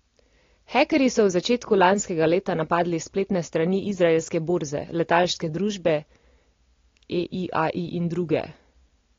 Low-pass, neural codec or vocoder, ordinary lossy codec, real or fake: 7.2 kHz; none; AAC, 32 kbps; real